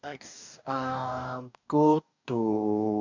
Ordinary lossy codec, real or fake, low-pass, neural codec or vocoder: none; fake; 7.2 kHz; codec, 44.1 kHz, 2.6 kbps, DAC